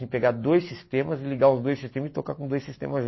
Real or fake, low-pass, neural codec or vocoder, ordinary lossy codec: real; 7.2 kHz; none; MP3, 24 kbps